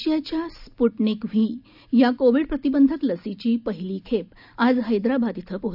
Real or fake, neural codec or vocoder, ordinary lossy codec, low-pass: real; none; none; 5.4 kHz